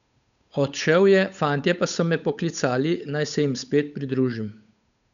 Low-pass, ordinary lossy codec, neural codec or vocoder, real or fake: 7.2 kHz; none; codec, 16 kHz, 8 kbps, FunCodec, trained on Chinese and English, 25 frames a second; fake